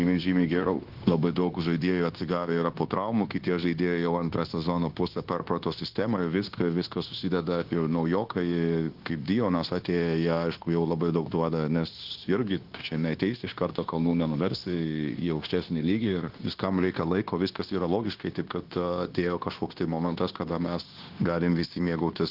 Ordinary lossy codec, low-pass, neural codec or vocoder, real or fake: Opus, 16 kbps; 5.4 kHz; codec, 16 kHz, 0.9 kbps, LongCat-Audio-Codec; fake